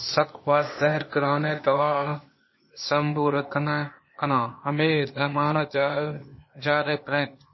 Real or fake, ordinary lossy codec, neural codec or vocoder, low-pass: fake; MP3, 24 kbps; codec, 16 kHz, 0.8 kbps, ZipCodec; 7.2 kHz